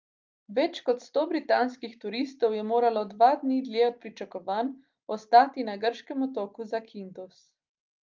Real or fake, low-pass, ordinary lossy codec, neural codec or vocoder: real; 7.2 kHz; Opus, 24 kbps; none